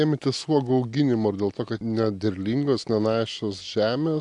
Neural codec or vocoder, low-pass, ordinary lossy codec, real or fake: none; 10.8 kHz; AAC, 64 kbps; real